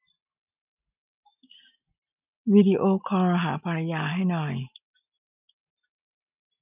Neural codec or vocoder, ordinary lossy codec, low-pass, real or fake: none; none; 3.6 kHz; real